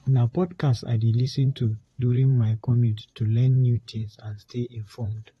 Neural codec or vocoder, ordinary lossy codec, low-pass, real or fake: vocoder, 44.1 kHz, 128 mel bands, Pupu-Vocoder; AAC, 32 kbps; 19.8 kHz; fake